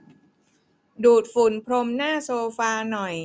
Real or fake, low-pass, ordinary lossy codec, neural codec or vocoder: real; none; none; none